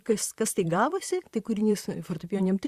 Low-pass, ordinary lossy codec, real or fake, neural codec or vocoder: 14.4 kHz; Opus, 64 kbps; fake; vocoder, 44.1 kHz, 128 mel bands, Pupu-Vocoder